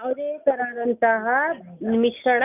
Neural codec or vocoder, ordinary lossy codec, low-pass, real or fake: none; none; 3.6 kHz; real